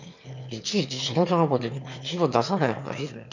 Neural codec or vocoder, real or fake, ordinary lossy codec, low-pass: autoencoder, 22.05 kHz, a latent of 192 numbers a frame, VITS, trained on one speaker; fake; none; 7.2 kHz